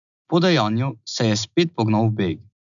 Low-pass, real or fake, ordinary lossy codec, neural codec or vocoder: 7.2 kHz; real; none; none